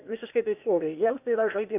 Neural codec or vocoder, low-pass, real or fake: codec, 16 kHz, 0.8 kbps, ZipCodec; 3.6 kHz; fake